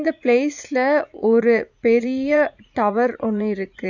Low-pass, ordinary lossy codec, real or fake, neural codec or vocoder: 7.2 kHz; none; fake; vocoder, 44.1 kHz, 80 mel bands, Vocos